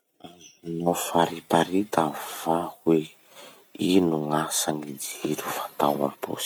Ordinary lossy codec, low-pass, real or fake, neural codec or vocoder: none; none; real; none